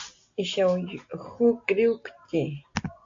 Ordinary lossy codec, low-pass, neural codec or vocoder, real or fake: AAC, 48 kbps; 7.2 kHz; none; real